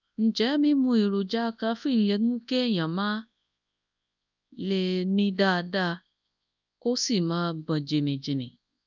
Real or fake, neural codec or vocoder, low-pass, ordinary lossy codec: fake; codec, 24 kHz, 0.9 kbps, WavTokenizer, large speech release; 7.2 kHz; none